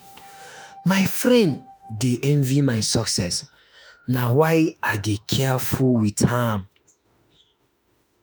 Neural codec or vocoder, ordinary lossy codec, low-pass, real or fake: autoencoder, 48 kHz, 32 numbers a frame, DAC-VAE, trained on Japanese speech; none; none; fake